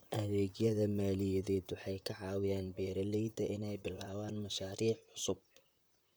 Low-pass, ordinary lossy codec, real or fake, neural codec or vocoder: none; none; fake; vocoder, 44.1 kHz, 128 mel bands, Pupu-Vocoder